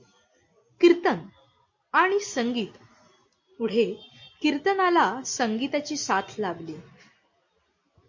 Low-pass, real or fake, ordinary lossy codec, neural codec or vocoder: 7.2 kHz; real; MP3, 48 kbps; none